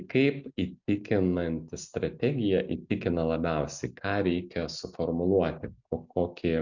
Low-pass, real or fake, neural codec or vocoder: 7.2 kHz; real; none